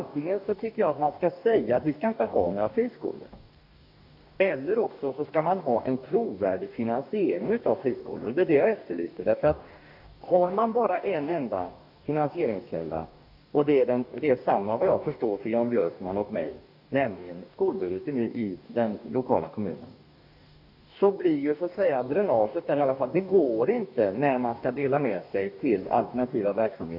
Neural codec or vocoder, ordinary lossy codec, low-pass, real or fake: codec, 44.1 kHz, 2.6 kbps, DAC; none; 5.4 kHz; fake